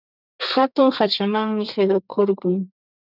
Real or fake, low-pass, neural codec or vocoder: fake; 5.4 kHz; codec, 32 kHz, 1.9 kbps, SNAC